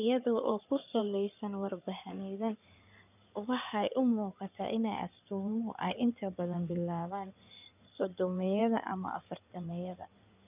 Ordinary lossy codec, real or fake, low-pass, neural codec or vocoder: none; fake; 3.6 kHz; codec, 16 kHz in and 24 kHz out, 2.2 kbps, FireRedTTS-2 codec